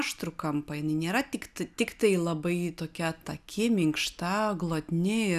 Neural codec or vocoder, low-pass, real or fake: none; 14.4 kHz; real